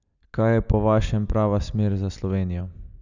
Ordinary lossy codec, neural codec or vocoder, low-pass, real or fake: none; none; 7.2 kHz; real